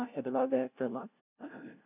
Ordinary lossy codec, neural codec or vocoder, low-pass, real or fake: none; codec, 16 kHz, 0.5 kbps, FunCodec, trained on LibriTTS, 25 frames a second; 3.6 kHz; fake